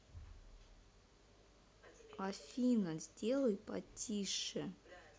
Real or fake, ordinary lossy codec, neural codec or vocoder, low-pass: real; none; none; none